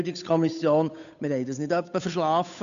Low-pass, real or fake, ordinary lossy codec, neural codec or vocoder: 7.2 kHz; fake; none; codec, 16 kHz, 8 kbps, FunCodec, trained on Chinese and English, 25 frames a second